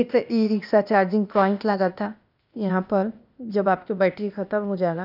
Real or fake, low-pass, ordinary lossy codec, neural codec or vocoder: fake; 5.4 kHz; none; codec, 16 kHz, 0.8 kbps, ZipCodec